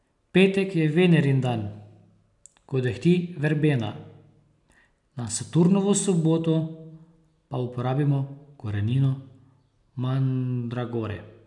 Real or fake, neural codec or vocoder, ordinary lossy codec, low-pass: real; none; none; 10.8 kHz